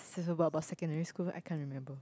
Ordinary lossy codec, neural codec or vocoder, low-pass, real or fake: none; none; none; real